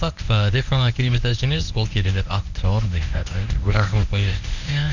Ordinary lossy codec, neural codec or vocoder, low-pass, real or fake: none; codec, 24 kHz, 0.9 kbps, WavTokenizer, medium speech release version 1; 7.2 kHz; fake